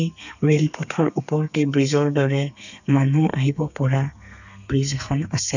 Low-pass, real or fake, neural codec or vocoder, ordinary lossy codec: 7.2 kHz; fake; codec, 32 kHz, 1.9 kbps, SNAC; none